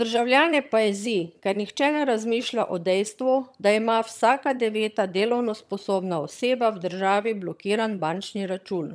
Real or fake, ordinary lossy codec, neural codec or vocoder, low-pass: fake; none; vocoder, 22.05 kHz, 80 mel bands, HiFi-GAN; none